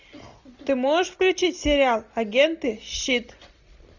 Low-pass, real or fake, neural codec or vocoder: 7.2 kHz; real; none